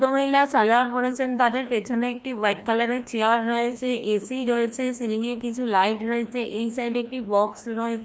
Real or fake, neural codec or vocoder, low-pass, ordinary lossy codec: fake; codec, 16 kHz, 1 kbps, FreqCodec, larger model; none; none